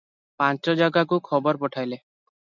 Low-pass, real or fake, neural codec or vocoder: 7.2 kHz; real; none